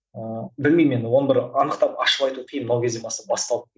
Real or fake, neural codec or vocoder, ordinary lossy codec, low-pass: real; none; none; none